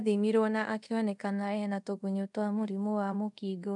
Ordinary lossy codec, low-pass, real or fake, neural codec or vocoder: none; 10.8 kHz; fake; codec, 24 kHz, 0.5 kbps, DualCodec